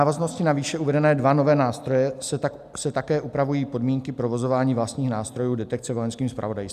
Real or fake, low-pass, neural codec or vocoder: real; 14.4 kHz; none